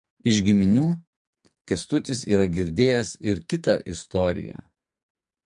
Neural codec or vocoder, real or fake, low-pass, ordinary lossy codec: codec, 44.1 kHz, 2.6 kbps, SNAC; fake; 10.8 kHz; MP3, 64 kbps